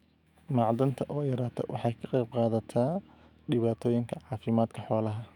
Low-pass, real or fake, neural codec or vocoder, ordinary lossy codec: 19.8 kHz; fake; autoencoder, 48 kHz, 128 numbers a frame, DAC-VAE, trained on Japanese speech; none